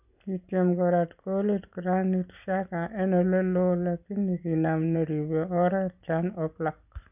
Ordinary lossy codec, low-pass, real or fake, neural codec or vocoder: none; 3.6 kHz; real; none